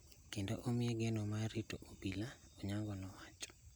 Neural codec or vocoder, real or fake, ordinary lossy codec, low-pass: none; real; none; none